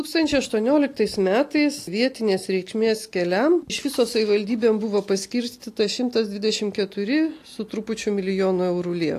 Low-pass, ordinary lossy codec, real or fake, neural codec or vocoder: 14.4 kHz; AAC, 64 kbps; real; none